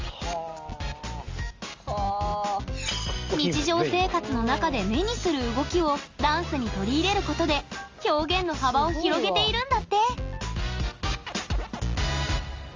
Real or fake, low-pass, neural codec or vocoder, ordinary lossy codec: real; 7.2 kHz; none; Opus, 32 kbps